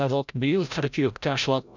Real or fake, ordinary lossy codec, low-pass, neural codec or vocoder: fake; none; 7.2 kHz; codec, 16 kHz, 0.5 kbps, FreqCodec, larger model